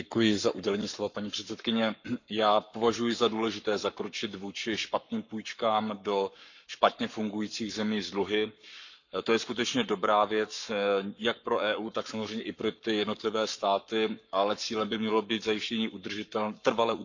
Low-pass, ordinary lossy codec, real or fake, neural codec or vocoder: 7.2 kHz; none; fake; codec, 44.1 kHz, 7.8 kbps, Pupu-Codec